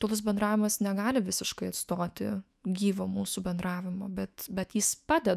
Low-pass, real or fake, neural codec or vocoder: 14.4 kHz; fake; autoencoder, 48 kHz, 128 numbers a frame, DAC-VAE, trained on Japanese speech